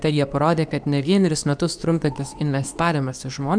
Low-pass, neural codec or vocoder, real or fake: 9.9 kHz; codec, 24 kHz, 0.9 kbps, WavTokenizer, medium speech release version 2; fake